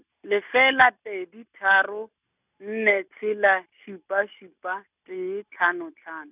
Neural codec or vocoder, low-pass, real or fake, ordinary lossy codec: none; 3.6 kHz; real; none